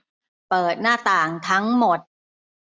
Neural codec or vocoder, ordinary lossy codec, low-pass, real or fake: none; none; none; real